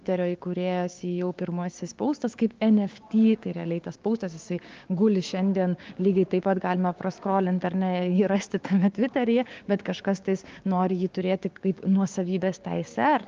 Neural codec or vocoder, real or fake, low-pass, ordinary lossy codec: codec, 16 kHz, 6 kbps, DAC; fake; 7.2 kHz; Opus, 32 kbps